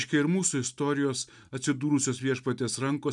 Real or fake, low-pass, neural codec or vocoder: real; 10.8 kHz; none